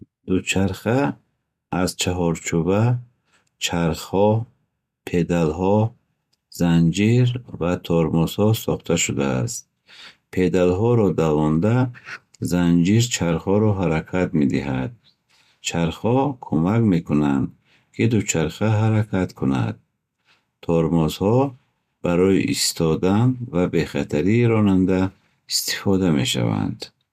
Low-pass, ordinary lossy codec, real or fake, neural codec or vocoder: 14.4 kHz; MP3, 96 kbps; real; none